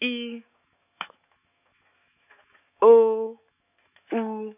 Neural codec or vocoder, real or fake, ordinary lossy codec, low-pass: autoencoder, 48 kHz, 128 numbers a frame, DAC-VAE, trained on Japanese speech; fake; none; 3.6 kHz